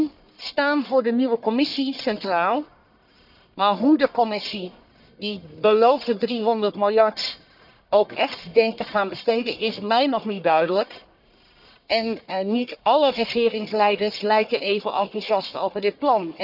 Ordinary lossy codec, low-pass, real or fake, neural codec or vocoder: none; 5.4 kHz; fake; codec, 44.1 kHz, 1.7 kbps, Pupu-Codec